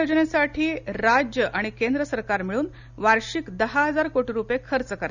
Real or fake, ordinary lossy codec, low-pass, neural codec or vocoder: real; none; 7.2 kHz; none